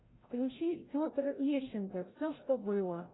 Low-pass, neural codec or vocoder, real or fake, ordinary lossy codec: 7.2 kHz; codec, 16 kHz, 0.5 kbps, FreqCodec, larger model; fake; AAC, 16 kbps